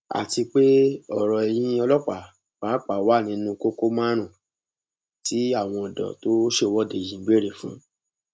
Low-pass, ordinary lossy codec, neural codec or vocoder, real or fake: none; none; none; real